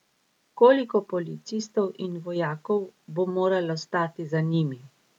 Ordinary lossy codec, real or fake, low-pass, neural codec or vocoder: none; real; 19.8 kHz; none